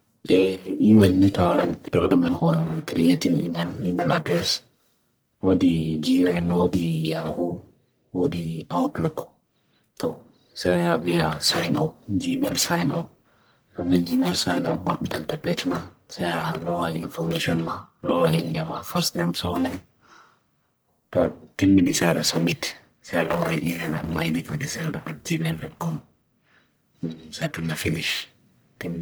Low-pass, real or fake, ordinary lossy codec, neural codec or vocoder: none; fake; none; codec, 44.1 kHz, 1.7 kbps, Pupu-Codec